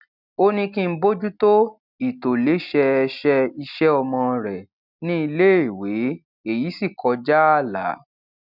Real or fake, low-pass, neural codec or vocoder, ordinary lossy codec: real; 5.4 kHz; none; none